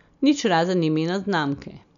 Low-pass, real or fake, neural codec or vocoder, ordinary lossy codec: 7.2 kHz; real; none; none